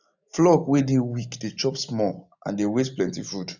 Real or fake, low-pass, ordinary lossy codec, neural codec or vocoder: real; 7.2 kHz; none; none